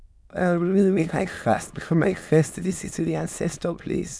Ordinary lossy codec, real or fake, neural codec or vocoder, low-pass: none; fake; autoencoder, 22.05 kHz, a latent of 192 numbers a frame, VITS, trained on many speakers; none